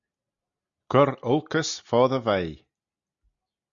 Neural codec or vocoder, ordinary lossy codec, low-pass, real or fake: none; Opus, 64 kbps; 7.2 kHz; real